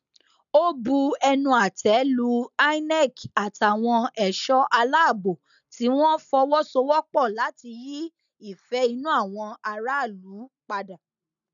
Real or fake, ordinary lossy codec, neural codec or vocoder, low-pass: real; none; none; 7.2 kHz